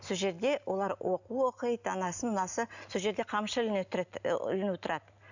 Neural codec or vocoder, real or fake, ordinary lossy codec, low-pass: none; real; none; 7.2 kHz